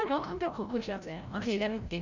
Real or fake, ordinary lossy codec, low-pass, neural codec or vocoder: fake; none; 7.2 kHz; codec, 16 kHz, 0.5 kbps, FreqCodec, larger model